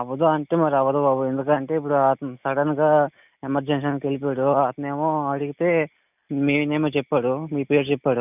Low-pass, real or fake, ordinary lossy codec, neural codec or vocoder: 3.6 kHz; real; none; none